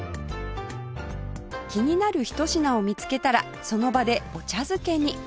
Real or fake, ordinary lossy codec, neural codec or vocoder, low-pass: real; none; none; none